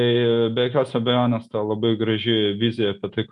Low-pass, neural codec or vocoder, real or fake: 10.8 kHz; none; real